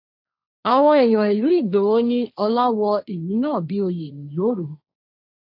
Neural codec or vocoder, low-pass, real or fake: codec, 16 kHz, 1.1 kbps, Voila-Tokenizer; 5.4 kHz; fake